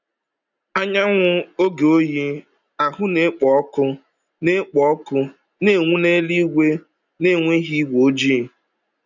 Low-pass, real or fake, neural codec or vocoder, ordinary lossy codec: 7.2 kHz; real; none; none